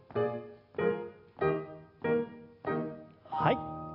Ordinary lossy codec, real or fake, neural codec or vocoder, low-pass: none; real; none; 5.4 kHz